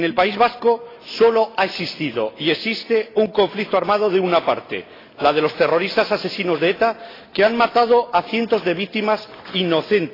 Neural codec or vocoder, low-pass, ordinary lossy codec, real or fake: none; 5.4 kHz; AAC, 24 kbps; real